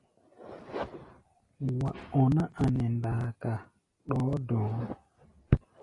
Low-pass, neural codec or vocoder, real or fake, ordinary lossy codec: 10.8 kHz; none; real; AAC, 32 kbps